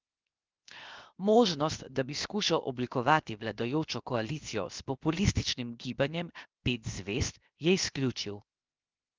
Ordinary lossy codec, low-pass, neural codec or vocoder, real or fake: Opus, 24 kbps; 7.2 kHz; codec, 16 kHz, 0.7 kbps, FocalCodec; fake